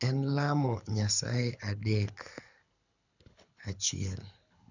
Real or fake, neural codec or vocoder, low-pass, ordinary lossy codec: fake; codec, 24 kHz, 6 kbps, HILCodec; 7.2 kHz; none